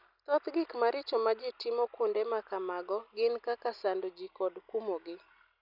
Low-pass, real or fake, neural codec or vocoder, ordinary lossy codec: 5.4 kHz; real; none; none